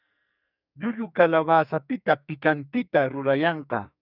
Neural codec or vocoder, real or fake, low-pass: codec, 44.1 kHz, 2.6 kbps, SNAC; fake; 5.4 kHz